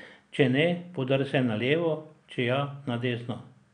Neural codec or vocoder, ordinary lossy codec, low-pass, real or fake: none; none; 9.9 kHz; real